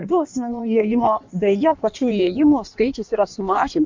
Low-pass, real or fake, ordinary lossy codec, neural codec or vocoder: 7.2 kHz; fake; MP3, 64 kbps; codec, 16 kHz in and 24 kHz out, 1.1 kbps, FireRedTTS-2 codec